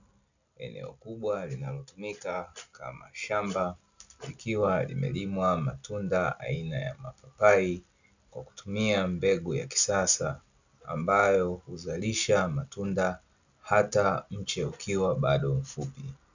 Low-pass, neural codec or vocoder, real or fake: 7.2 kHz; none; real